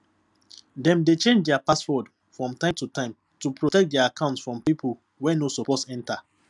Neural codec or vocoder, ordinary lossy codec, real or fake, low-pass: none; none; real; 10.8 kHz